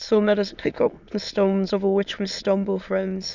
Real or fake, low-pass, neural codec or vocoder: fake; 7.2 kHz; autoencoder, 22.05 kHz, a latent of 192 numbers a frame, VITS, trained on many speakers